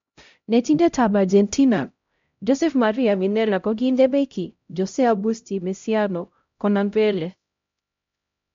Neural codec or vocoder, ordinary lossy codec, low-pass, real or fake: codec, 16 kHz, 0.5 kbps, X-Codec, HuBERT features, trained on LibriSpeech; MP3, 48 kbps; 7.2 kHz; fake